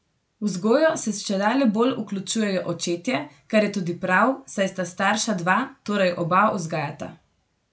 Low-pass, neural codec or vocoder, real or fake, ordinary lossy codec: none; none; real; none